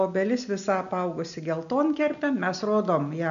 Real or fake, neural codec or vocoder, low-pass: real; none; 7.2 kHz